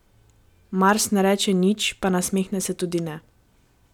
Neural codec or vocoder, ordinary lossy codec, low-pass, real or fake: none; none; 19.8 kHz; real